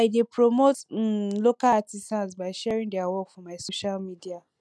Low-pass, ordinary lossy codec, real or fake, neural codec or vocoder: none; none; real; none